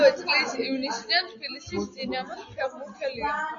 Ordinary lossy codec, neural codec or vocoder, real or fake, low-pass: MP3, 48 kbps; none; real; 7.2 kHz